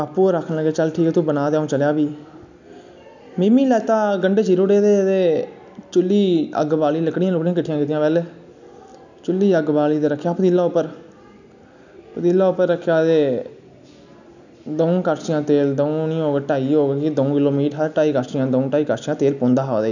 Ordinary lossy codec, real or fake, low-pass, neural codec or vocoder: none; real; 7.2 kHz; none